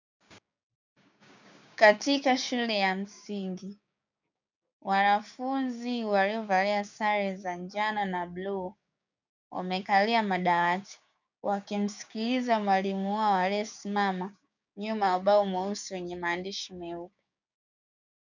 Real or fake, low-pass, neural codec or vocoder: fake; 7.2 kHz; codec, 16 kHz, 6 kbps, DAC